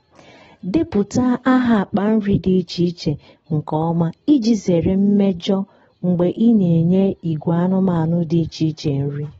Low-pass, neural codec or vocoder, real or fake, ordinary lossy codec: 19.8 kHz; none; real; AAC, 24 kbps